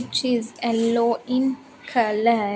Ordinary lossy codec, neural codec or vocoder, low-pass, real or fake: none; none; none; real